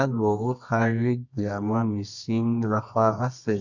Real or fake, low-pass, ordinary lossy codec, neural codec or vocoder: fake; 7.2 kHz; none; codec, 24 kHz, 0.9 kbps, WavTokenizer, medium music audio release